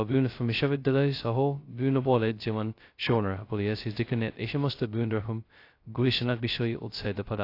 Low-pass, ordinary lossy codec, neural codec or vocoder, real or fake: 5.4 kHz; AAC, 32 kbps; codec, 16 kHz, 0.2 kbps, FocalCodec; fake